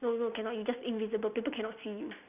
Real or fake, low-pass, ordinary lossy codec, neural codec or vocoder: real; 3.6 kHz; none; none